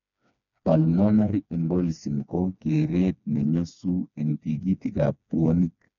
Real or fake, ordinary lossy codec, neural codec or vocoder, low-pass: fake; none; codec, 16 kHz, 2 kbps, FreqCodec, smaller model; 7.2 kHz